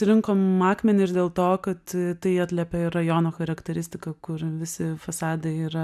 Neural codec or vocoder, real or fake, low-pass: none; real; 14.4 kHz